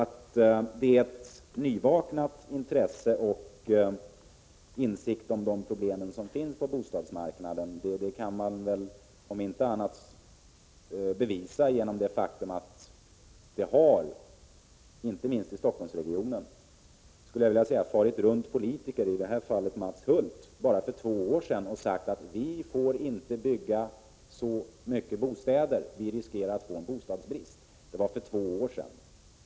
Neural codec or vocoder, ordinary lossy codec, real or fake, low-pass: none; none; real; none